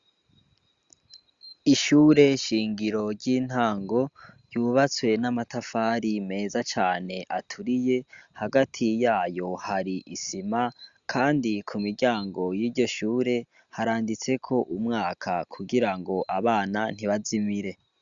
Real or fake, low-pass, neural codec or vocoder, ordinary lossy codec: real; 7.2 kHz; none; Opus, 64 kbps